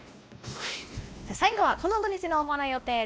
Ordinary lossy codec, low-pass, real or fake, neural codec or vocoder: none; none; fake; codec, 16 kHz, 1 kbps, X-Codec, WavLM features, trained on Multilingual LibriSpeech